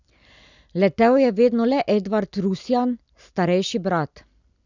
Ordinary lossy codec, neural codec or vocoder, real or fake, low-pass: none; none; real; 7.2 kHz